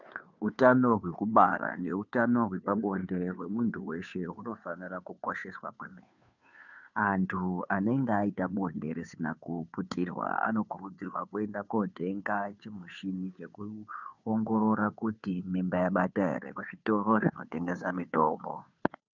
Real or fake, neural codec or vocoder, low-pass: fake; codec, 16 kHz, 2 kbps, FunCodec, trained on Chinese and English, 25 frames a second; 7.2 kHz